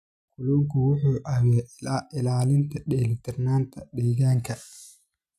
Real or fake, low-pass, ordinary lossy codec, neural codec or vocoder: real; 14.4 kHz; none; none